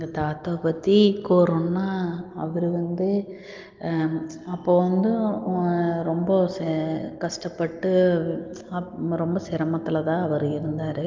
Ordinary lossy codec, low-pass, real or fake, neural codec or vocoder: Opus, 24 kbps; 7.2 kHz; real; none